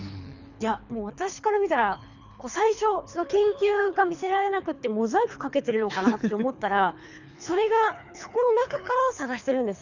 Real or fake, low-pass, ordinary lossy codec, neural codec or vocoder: fake; 7.2 kHz; none; codec, 24 kHz, 3 kbps, HILCodec